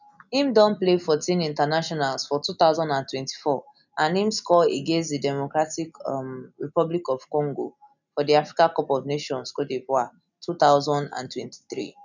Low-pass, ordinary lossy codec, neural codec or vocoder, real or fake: 7.2 kHz; none; none; real